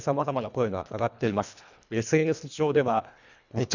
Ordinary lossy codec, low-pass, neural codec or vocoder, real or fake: none; 7.2 kHz; codec, 24 kHz, 1.5 kbps, HILCodec; fake